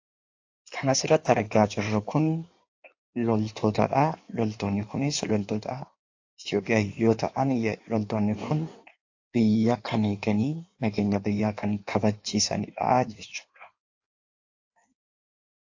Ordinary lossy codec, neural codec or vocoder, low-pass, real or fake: AAC, 48 kbps; codec, 16 kHz in and 24 kHz out, 1.1 kbps, FireRedTTS-2 codec; 7.2 kHz; fake